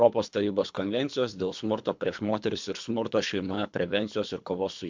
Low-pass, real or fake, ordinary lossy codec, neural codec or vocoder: 7.2 kHz; fake; MP3, 64 kbps; codec, 24 kHz, 3 kbps, HILCodec